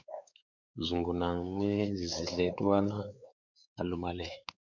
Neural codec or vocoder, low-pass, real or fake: codec, 16 kHz, 4 kbps, X-Codec, WavLM features, trained on Multilingual LibriSpeech; 7.2 kHz; fake